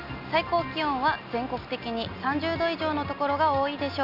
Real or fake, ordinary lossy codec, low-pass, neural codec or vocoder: real; none; 5.4 kHz; none